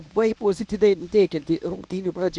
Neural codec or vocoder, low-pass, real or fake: codec, 24 kHz, 0.9 kbps, WavTokenizer, medium speech release version 1; 10.8 kHz; fake